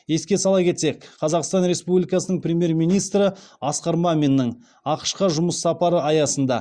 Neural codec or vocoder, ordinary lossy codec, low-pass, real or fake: none; Opus, 64 kbps; 9.9 kHz; real